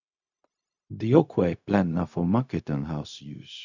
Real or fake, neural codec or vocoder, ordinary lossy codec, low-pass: fake; codec, 16 kHz, 0.4 kbps, LongCat-Audio-Codec; none; 7.2 kHz